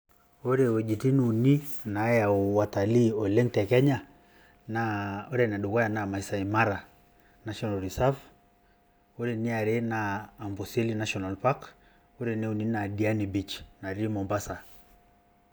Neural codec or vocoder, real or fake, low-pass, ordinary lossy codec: none; real; none; none